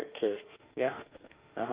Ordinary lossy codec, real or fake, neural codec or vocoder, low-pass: Opus, 24 kbps; fake; autoencoder, 48 kHz, 32 numbers a frame, DAC-VAE, trained on Japanese speech; 3.6 kHz